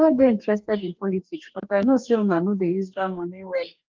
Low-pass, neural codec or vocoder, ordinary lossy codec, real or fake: 7.2 kHz; codec, 44.1 kHz, 2.6 kbps, DAC; Opus, 24 kbps; fake